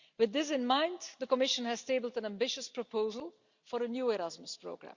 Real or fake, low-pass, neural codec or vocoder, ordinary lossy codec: real; 7.2 kHz; none; Opus, 64 kbps